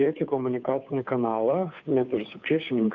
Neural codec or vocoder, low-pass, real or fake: codec, 16 kHz, 2 kbps, FunCodec, trained on Chinese and English, 25 frames a second; 7.2 kHz; fake